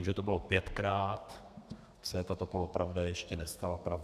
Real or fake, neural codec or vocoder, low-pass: fake; codec, 32 kHz, 1.9 kbps, SNAC; 14.4 kHz